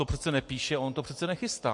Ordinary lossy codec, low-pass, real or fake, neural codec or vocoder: MP3, 48 kbps; 10.8 kHz; real; none